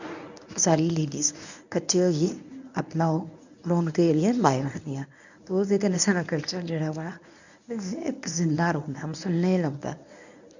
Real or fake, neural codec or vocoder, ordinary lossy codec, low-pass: fake; codec, 24 kHz, 0.9 kbps, WavTokenizer, medium speech release version 1; none; 7.2 kHz